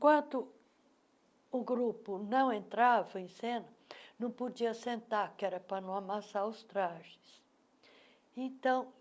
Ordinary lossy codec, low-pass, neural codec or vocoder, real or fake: none; none; none; real